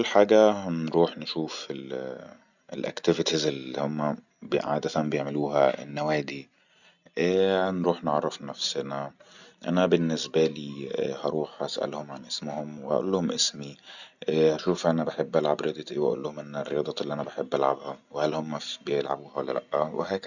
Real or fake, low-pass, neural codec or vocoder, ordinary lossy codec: real; 7.2 kHz; none; none